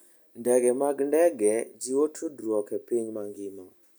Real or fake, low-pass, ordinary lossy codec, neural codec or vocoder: real; none; none; none